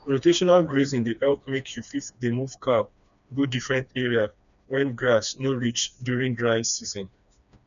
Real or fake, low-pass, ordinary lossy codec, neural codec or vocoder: fake; 7.2 kHz; none; codec, 16 kHz, 2 kbps, FreqCodec, smaller model